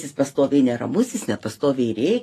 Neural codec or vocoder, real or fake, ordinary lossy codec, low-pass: none; real; AAC, 48 kbps; 14.4 kHz